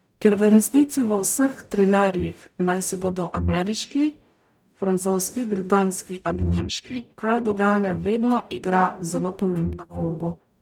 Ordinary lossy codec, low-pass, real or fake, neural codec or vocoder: none; 19.8 kHz; fake; codec, 44.1 kHz, 0.9 kbps, DAC